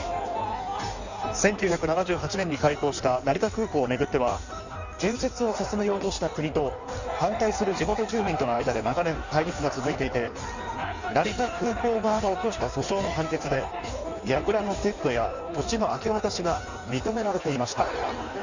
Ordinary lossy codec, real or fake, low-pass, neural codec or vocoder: none; fake; 7.2 kHz; codec, 16 kHz in and 24 kHz out, 1.1 kbps, FireRedTTS-2 codec